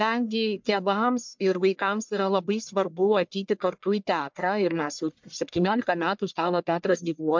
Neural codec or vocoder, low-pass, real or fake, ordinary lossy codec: codec, 44.1 kHz, 1.7 kbps, Pupu-Codec; 7.2 kHz; fake; MP3, 64 kbps